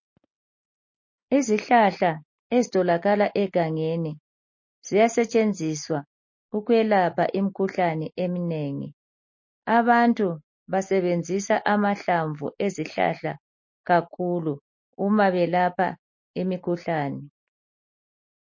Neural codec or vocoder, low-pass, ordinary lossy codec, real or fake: none; 7.2 kHz; MP3, 32 kbps; real